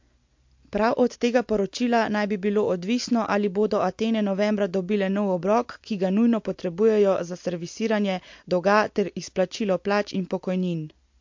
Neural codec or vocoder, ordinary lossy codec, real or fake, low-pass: none; MP3, 48 kbps; real; 7.2 kHz